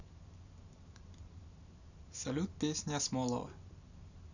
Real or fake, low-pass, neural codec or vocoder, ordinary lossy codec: real; 7.2 kHz; none; none